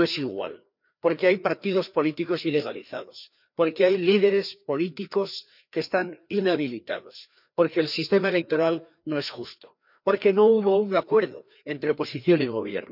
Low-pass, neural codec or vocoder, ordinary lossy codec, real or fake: 5.4 kHz; codec, 16 kHz, 2 kbps, FreqCodec, larger model; none; fake